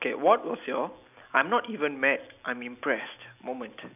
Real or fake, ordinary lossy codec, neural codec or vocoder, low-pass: real; none; none; 3.6 kHz